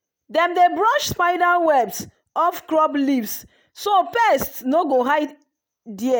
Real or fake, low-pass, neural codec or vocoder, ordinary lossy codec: real; none; none; none